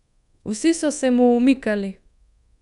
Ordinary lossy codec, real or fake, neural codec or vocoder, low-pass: none; fake; codec, 24 kHz, 1.2 kbps, DualCodec; 10.8 kHz